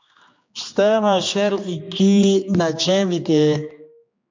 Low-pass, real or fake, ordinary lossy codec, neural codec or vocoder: 7.2 kHz; fake; MP3, 64 kbps; codec, 16 kHz, 2 kbps, X-Codec, HuBERT features, trained on general audio